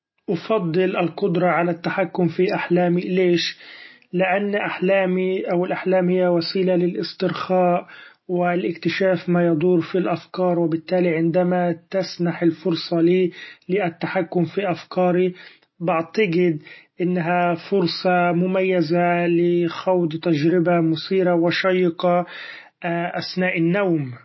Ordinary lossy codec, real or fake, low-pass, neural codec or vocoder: MP3, 24 kbps; real; 7.2 kHz; none